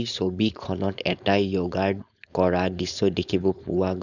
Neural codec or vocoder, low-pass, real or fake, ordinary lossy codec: codec, 16 kHz, 4.8 kbps, FACodec; 7.2 kHz; fake; none